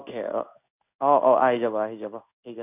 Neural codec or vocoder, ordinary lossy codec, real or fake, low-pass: codec, 16 kHz in and 24 kHz out, 1 kbps, XY-Tokenizer; none; fake; 3.6 kHz